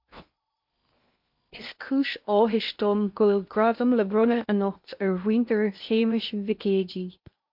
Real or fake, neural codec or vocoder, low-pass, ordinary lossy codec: fake; codec, 16 kHz in and 24 kHz out, 0.8 kbps, FocalCodec, streaming, 65536 codes; 5.4 kHz; MP3, 48 kbps